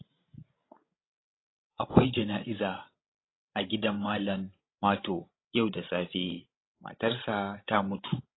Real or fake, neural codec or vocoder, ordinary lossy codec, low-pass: fake; codec, 16 kHz, 16 kbps, FreqCodec, larger model; AAC, 16 kbps; 7.2 kHz